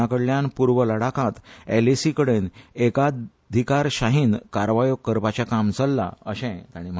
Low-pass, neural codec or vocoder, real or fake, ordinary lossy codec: none; none; real; none